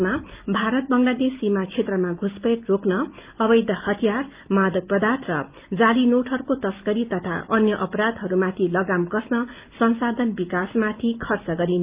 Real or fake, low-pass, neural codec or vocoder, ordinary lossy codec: real; 3.6 kHz; none; Opus, 24 kbps